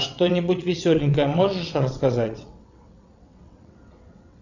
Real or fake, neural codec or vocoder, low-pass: fake; vocoder, 22.05 kHz, 80 mel bands, WaveNeXt; 7.2 kHz